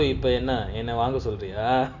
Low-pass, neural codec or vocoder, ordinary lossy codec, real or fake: 7.2 kHz; none; none; real